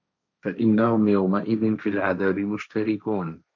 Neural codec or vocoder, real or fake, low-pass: codec, 16 kHz, 1.1 kbps, Voila-Tokenizer; fake; 7.2 kHz